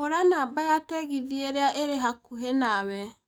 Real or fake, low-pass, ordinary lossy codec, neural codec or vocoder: fake; none; none; codec, 44.1 kHz, 7.8 kbps, DAC